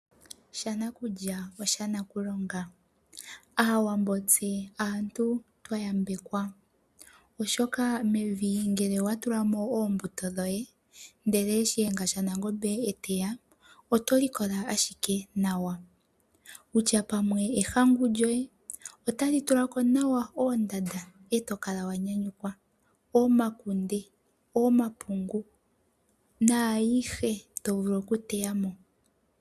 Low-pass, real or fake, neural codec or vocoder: 14.4 kHz; real; none